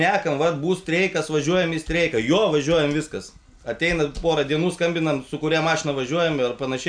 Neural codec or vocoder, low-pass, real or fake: none; 9.9 kHz; real